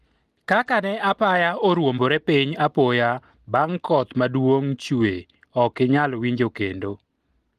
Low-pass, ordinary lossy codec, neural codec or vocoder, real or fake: 14.4 kHz; Opus, 24 kbps; none; real